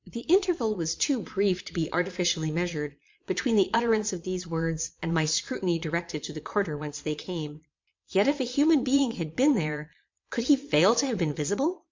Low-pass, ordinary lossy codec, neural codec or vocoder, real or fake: 7.2 kHz; MP3, 48 kbps; vocoder, 22.05 kHz, 80 mel bands, Vocos; fake